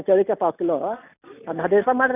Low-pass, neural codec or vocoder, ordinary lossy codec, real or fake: 3.6 kHz; none; none; real